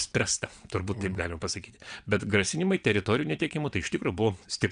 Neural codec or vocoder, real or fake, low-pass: vocoder, 22.05 kHz, 80 mel bands, WaveNeXt; fake; 9.9 kHz